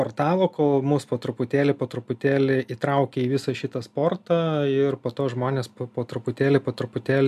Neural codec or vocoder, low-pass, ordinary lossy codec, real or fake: none; 14.4 kHz; MP3, 96 kbps; real